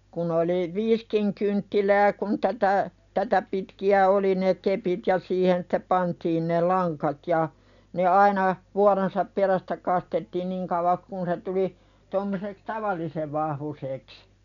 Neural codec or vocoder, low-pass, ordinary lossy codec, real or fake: none; 7.2 kHz; none; real